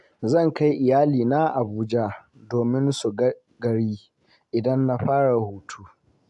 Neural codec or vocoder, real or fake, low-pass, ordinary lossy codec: none; real; 10.8 kHz; none